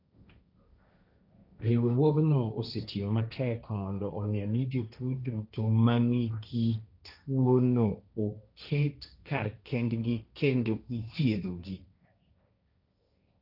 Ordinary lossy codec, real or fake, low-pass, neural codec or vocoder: none; fake; 5.4 kHz; codec, 16 kHz, 1.1 kbps, Voila-Tokenizer